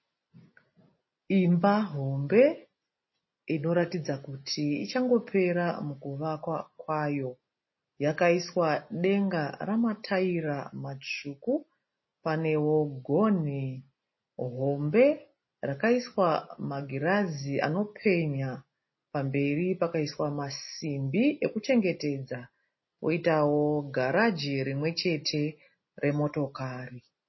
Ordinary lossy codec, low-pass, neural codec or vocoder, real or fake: MP3, 24 kbps; 7.2 kHz; none; real